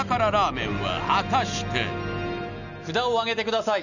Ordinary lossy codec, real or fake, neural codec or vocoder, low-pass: none; real; none; 7.2 kHz